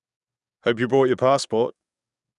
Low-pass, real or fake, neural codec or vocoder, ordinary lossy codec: 10.8 kHz; fake; codec, 44.1 kHz, 7.8 kbps, DAC; none